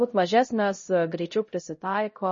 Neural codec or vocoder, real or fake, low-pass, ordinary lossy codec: codec, 16 kHz, 0.5 kbps, X-Codec, HuBERT features, trained on LibriSpeech; fake; 7.2 kHz; MP3, 32 kbps